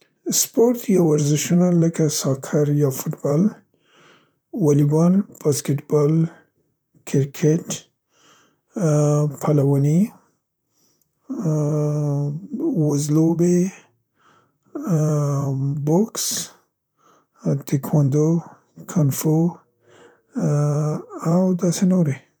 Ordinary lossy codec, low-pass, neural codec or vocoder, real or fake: none; none; vocoder, 44.1 kHz, 128 mel bands, Pupu-Vocoder; fake